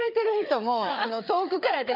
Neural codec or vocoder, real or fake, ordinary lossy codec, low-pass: codec, 16 kHz, 4 kbps, FreqCodec, larger model; fake; none; 5.4 kHz